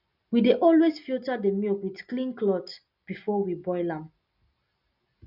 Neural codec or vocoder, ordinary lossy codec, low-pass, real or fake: none; none; 5.4 kHz; real